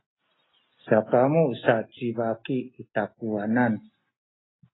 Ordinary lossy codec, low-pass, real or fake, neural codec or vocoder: AAC, 16 kbps; 7.2 kHz; real; none